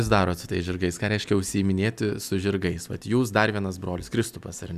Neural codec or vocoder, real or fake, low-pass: none; real; 14.4 kHz